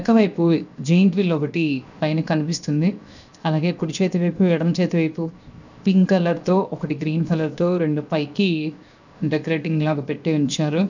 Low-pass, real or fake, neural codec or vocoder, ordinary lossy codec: 7.2 kHz; fake; codec, 16 kHz, 0.7 kbps, FocalCodec; none